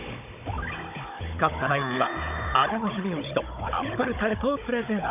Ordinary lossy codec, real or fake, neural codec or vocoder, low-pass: none; fake; codec, 16 kHz, 16 kbps, FunCodec, trained on Chinese and English, 50 frames a second; 3.6 kHz